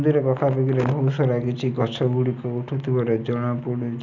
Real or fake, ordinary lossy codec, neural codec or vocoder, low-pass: real; none; none; 7.2 kHz